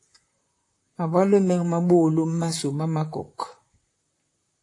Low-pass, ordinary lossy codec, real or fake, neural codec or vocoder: 10.8 kHz; AAC, 48 kbps; fake; vocoder, 44.1 kHz, 128 mel bands, Pupu-Vocoder